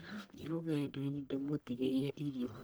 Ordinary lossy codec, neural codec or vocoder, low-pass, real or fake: none; codec, 44.1 kHz, 1.7 kbps, Pupu-Codec; none; fake